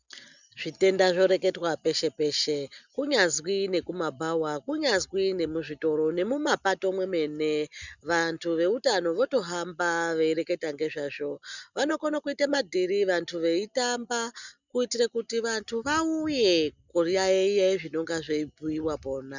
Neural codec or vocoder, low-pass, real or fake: none; 7.2 kHz; real